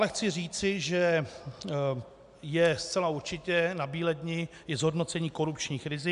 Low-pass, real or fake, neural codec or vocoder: 10.8 kHz; real; none